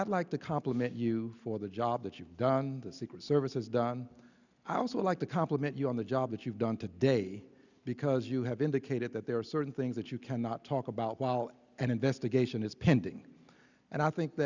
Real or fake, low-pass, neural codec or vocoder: real; 7.2 kHz; none